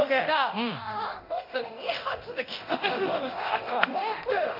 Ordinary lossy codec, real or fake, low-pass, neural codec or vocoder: none; fake; 5.4 kHz; codec, 24 kHz, 0.9 kbps, DualCodec